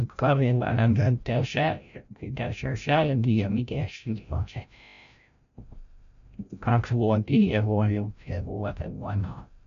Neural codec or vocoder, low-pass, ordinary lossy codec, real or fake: codec, 16 kHz, 0.5 kbps, FreqCodec, larger model; 7.2 kHz; AAC, 96 kbps; fake